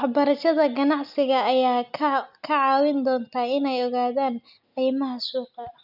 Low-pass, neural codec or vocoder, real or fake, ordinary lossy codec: 5.4 kHz; none; real; none